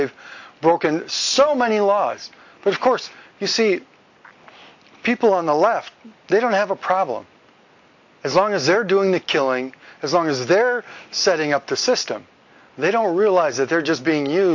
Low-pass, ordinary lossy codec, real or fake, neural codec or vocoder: 7.2 kHz; AAC, 48 kbps; real; none